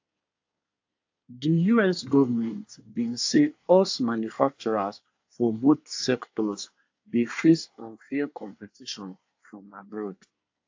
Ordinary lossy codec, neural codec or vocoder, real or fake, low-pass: AAC, 48 kbps; codec, 24 kHz, 1 kbps, SNAC; fake; 7.2 kHz